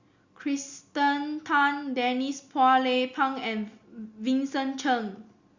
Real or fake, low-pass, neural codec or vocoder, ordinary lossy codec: real; 7.2 kHz; none; Opus, 64 kbps